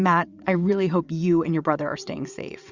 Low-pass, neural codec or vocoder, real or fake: 7.2 kHz; vocoder, 22.05 kHz, 80 mel bands, WaveNeXt; fake